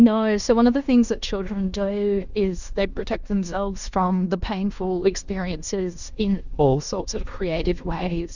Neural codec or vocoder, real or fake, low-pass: codec, 16 kHz in and 24 kHz out, 0.9 kbps, LongCat-Audio-Codec, fine tuned four codebook decoder; fake; 7.2 kHz